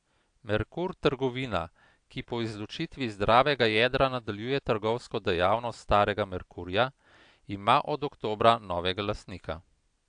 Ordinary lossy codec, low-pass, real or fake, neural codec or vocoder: AAC, 64 kbps; 9.9 kHz; real; none